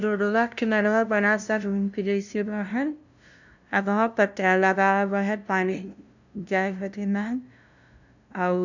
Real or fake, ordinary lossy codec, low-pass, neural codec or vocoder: fake; none; 7.2 kHz; codec, 16 kHz, 0.5 kbps, FunCodec, trained on LibriTTS, 25 frames a second